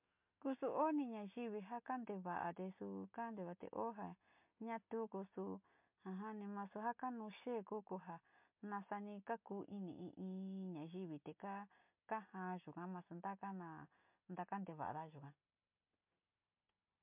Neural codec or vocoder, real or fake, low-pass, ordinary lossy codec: none; real; 3.6 kHz; MP3, 32 kbps